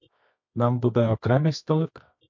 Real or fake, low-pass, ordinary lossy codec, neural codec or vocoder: fake; 7.2 kHz; MP3, 48 kbps; codec, 24 kHz, 0.9 kbps, WavTokenizer, medium music audio release